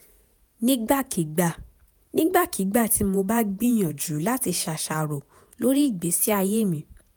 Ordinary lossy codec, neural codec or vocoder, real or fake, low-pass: none; vocoder, 48 kHz, 128 mel bands, Vocos; fake; none